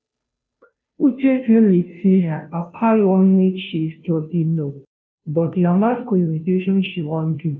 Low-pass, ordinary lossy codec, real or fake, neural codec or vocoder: none; none; fake; codec, 16 kHz, 0.5 kbps, FunCodec, trained on Chinese and English, 25 frames a second